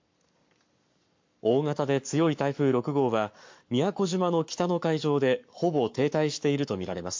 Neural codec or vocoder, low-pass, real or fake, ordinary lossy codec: codec, 44.1 kHz, 7.8 kbps, Pupu-Codec; 7.2 kHz; fake; MP3, 48 kbps